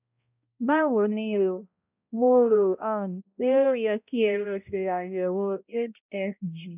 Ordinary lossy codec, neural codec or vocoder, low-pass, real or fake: none; codec, 16 kHz, 0.5 kbps, X-Codec, HuBERT features, trained on balanced general audio; 3.6 kHz; fake